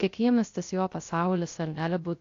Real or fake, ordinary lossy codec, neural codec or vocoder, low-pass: fake; AAC, 48 kbps; codec, 16 kHz, 0.3 kbps, FocalCodec; 7.2 kHz